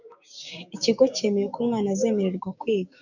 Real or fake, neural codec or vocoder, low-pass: fake; codec, 16 kHz, 6 kbps, DAC; 7.2 kHz